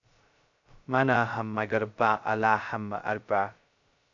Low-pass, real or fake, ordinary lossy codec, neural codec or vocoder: 7.2 kHz; fake; AAC, 64 kbps; codec, 16 kHz, 0.2 kbps, FocalCodec